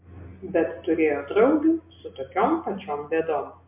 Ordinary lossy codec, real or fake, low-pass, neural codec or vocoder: MP3, 32 kbps; real; 3.6 kHz; none